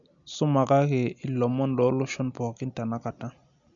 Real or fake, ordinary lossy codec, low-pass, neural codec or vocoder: real; none; 7.2 kHz; none